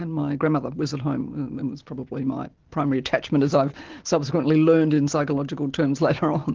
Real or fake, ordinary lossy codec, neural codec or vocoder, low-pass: real; Opus, 16 kbps; none; 7.2 kHz